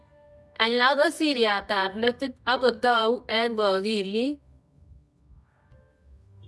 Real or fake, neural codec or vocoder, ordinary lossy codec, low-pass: fake; codec, 24 kHz, 0.9 kbps, WavTokenizer, medium music audio release; none; none